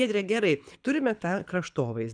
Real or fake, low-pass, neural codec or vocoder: fake; 9.9 kHz; codec, 24 kHz, 6 kbps, HILCodec